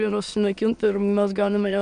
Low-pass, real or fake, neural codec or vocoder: 9.9 kHz; fake; autoencoder, 22.05 kHz, a latent of 192 numbers a frame, VITS, trained on many speakers